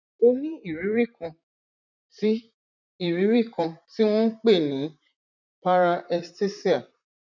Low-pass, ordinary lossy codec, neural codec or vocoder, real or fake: 7.2 kHz; none; vocoder, 44.1 kHz, 128 mel bands, Pupu-Vocoder; fake